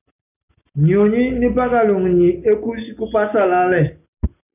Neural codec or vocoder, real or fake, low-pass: none; real; 3.6 kHz